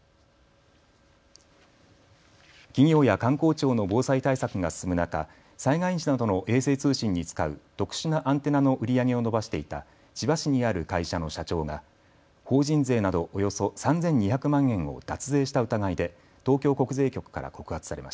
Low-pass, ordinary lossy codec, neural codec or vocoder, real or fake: none; none; none; real